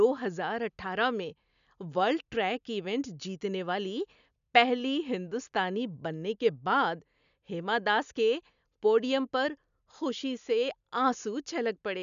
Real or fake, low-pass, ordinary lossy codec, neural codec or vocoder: real; 7.2 kHz; none; none